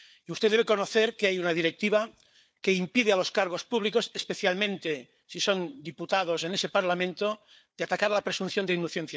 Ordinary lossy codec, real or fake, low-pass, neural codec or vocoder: none; fake; none; codec, 16 kHz, 4 kbps, FunCodec, trained on Chinese and English, 50 frames a second